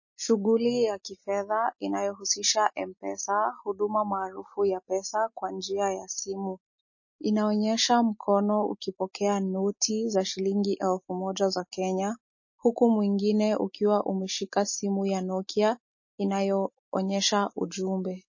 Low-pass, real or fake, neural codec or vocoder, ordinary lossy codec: 7.2 kHz; real; none; MP3, 32 kbps